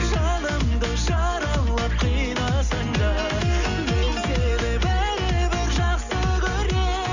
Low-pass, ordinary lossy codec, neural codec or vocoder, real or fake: 7.2 kHz; none; none; real